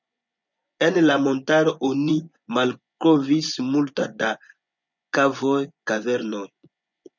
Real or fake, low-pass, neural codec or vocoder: fake; 7.2 kHz; vocoder, 24 kHz, 100 mel bands, Vocos